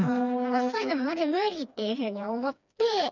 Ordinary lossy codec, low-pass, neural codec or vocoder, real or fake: none; 7.2 kHz; codec, 16 kHz, 2 kbps, FreqCodec, smaller model; fake